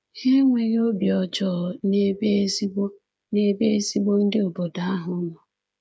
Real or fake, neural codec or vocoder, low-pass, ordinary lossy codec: fake; codec, 16 kHz, 8 kbps, FreqCodec, smaller model; none; none